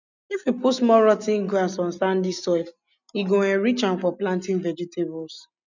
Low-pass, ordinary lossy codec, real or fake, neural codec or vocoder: 7.2 kHz; none; real; none